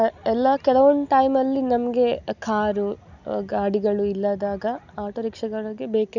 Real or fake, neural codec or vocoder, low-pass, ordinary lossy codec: real; none; 7.2 kHz; none